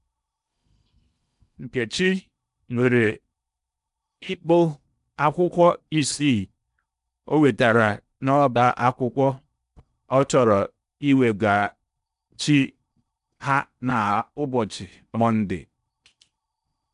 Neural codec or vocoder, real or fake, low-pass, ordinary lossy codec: codec, 16 kHz in and 24 kHz out, 0.8 kbps, FocalCodec, streaming, 65536 codes; fake; 10.8 kHz; none